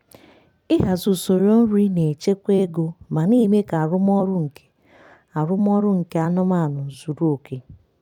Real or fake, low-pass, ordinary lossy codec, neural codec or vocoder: fake; 19.8 kHz; none; vocoder, 44.1 kHz, 128 mel bands every 256 samples, BigVGAN v2